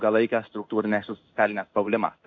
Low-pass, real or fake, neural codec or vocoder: 7.2 kHz; fake; codec, 16 kHz in and 24 kHz out, 1 kbps, XY-Tokenizer